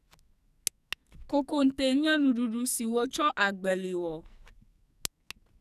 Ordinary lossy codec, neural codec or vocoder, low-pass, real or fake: none; codec, 44.1 kHz, 2.6 kbps, SNAC; 14.4 kHz; fake